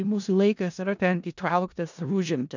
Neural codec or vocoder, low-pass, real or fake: codec, 16 kHz in and 24 kHz out, 0.4 kbps, LongCat-Audio-Codec, four codebook decoder; 7.2 kHz; fake